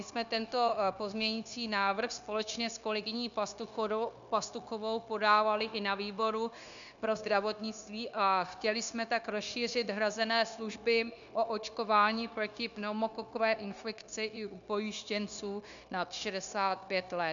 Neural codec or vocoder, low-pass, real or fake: codec, 16 kHz, 0.9 kbps, LongCat-Audio-Codec; 7.2 kHz; fake